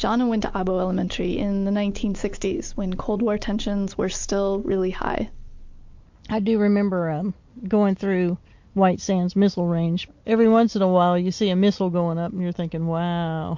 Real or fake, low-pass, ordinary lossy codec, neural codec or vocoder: real; 7.2 kHz; MP3, 48 kbps; none